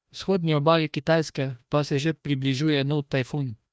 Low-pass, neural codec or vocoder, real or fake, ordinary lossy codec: none; codec, 16 kHz, 1 kbps, FreqCodec, larger model; fake; none